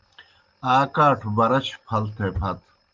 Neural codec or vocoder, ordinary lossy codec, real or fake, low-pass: none; Opus, 32 kbps; real; 7.2 kHz